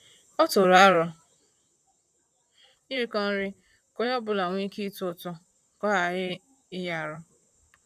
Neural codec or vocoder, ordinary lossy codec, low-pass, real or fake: vocoder, 44.1 kHz, 128 mel bands, Pupu-Vocoder; none; 14.4 kHz; fake